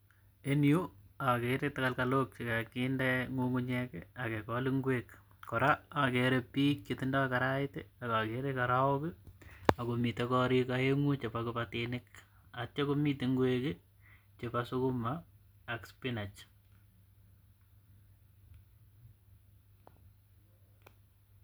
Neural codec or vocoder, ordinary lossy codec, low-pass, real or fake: none; none; none; real